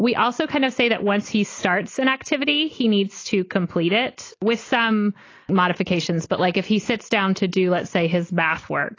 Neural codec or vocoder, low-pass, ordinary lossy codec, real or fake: none; 7.2 kHz; AAC, 32 kbps; real